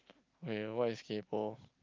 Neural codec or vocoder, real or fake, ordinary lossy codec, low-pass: none; real; Opus, 24 kbps; 7.2 kHz